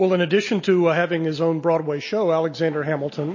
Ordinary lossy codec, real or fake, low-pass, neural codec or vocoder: MP3, 32 kbps; real; 7.2 kHz; none